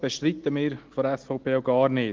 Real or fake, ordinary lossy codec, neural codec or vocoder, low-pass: real; Opus, 16 kbps; none; 7.2 kHz